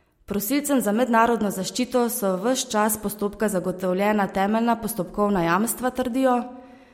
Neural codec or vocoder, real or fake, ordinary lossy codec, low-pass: none; real; MP3, 64 kbps; 19.8 kHz